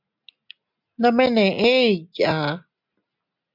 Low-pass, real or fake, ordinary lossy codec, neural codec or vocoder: 5.4 kHz; real; MP3, 48 kbps; none